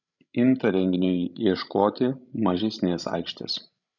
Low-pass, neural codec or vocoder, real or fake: 7.2 kHz; codec, 16 kHz, 16 kbps, FreqCodec, larger model; fake